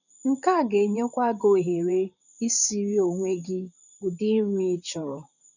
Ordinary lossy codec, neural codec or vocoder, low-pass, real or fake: none; vocoder, 44.1 kHz, 128 mel bands, Pupu-Vocoder; 7.2 kHz; fake